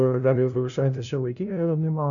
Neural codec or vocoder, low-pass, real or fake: codec, 16 kHz, 0.5 kbps, FunCodec, trained on LibriTTS, 25 frames a second; 7.2 kHz; fake